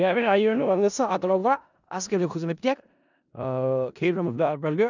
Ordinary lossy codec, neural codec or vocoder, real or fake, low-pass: none; codec, 16 kHz in and 24 kHz out, 0.4 kbps, LongCat-Audio-Codec, four codebook decoder; fake; 7.2 kHz